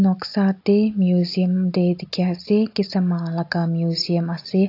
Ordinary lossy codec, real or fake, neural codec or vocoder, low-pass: AAC, 32 kbps; real; none; 5.4 kHz